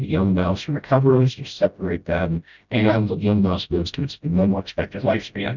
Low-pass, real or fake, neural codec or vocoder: 7.2 kHz; fake; codec, 16 kHz, 0.5 kbps, FreqCodec, smaller model